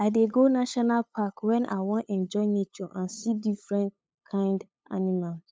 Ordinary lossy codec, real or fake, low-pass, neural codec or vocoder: none; fake; none; codec, 16 kHz, 8 kbps, FunCodec, trained on LibriTTS, 25 frames a second